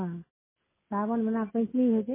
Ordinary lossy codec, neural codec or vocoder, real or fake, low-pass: MP3, 16 kbps; none; real; 3.6 kHz